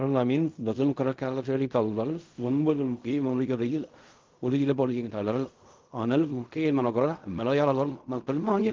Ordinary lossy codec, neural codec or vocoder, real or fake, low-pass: Opus, 32 kbps; codec, 16 kHz in and 24 kHz out, 0.4 kbps, LongCat-Audio-Codec, fine tuned four codebook decoder; fake; 7.2 kHz